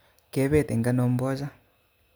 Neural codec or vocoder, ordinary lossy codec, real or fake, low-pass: none; none; real; none